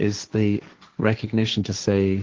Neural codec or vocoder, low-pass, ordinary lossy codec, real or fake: codec, 16 kHz, 1.1 kbps, Voila-Tokenizer; 7.2 kHz; Opus, 16 kbps; fake